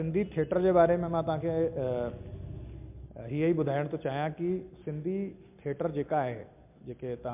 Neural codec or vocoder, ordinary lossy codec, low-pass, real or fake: none; MP3, 32 kbps; 3.6 kHz; real